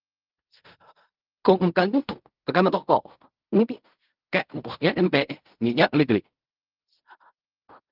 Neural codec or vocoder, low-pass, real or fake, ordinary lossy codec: codec, 16 kHz in and 24 kHz out, 0.4 kbps, LongCat-Audio-Codec, fine tuned four codebook decoder; 5.4 kHz; fake; Opus, 32 kbps